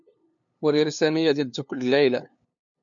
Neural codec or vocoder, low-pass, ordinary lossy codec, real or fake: codec, 16 kHz, 2 kbps, FunCodec, trained on LibriTTS, 25 frames a second; 7.2 kHz; MP3, 64 kbps; fake